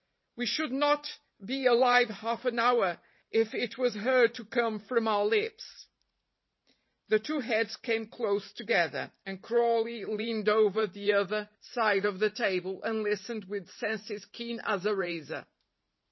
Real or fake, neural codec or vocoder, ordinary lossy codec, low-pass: fake; vocoder, 44.1 kHz, 128 mel bands every 512 samples, BigVGAN v2; MP3, 24 kbps; 7.2 kHz